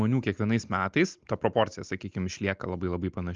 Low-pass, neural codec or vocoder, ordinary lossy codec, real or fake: 7.2 kHz; none; Opus, 24 kbps; real